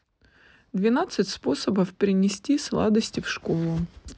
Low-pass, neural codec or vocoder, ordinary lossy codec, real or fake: none; none; none; real